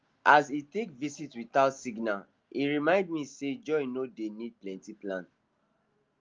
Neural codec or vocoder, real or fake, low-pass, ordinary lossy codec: none; real; 7.2 kHz; Opus, 24 kbps